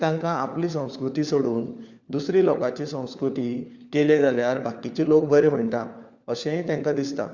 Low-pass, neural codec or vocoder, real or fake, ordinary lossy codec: 7.2 kHz; codec, 16 kHz, 4 kbps, FunCodec, trained on LibriTTS, 50 frames a second; fake; Opus, 64 kbps